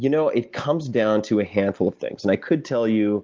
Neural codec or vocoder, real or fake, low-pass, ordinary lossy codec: none; real; 7.2 kHz; Opus, 32 kbps